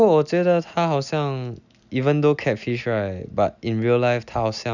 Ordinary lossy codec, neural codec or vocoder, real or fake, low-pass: none; none; real; 7.2 kHz